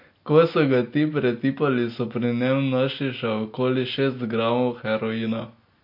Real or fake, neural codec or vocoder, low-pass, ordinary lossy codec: real; none; 5.4 kHz; MP3, 32 kbps